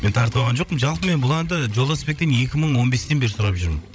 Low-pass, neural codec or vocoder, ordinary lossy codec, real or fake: none; codec, 16 kHz, 16 kbps, FreqCodec, larger model; none; fake